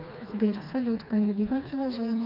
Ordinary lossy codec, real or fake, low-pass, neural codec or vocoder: none; fake; 5.4 kHz; codec, 16 kHz, 2 kbps, FreqCodec, smaller model